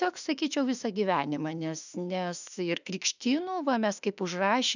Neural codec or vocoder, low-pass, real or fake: autoencoder, 48 kHz, 32 numbers a frame, DAC-VAE, trained on Japanese speech; 7.2 kHz; fake